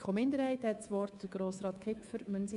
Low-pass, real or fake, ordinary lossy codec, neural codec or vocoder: 10.8 kHz; fake; none; codec, 24 kHz, 3.1 kbps, DualCodec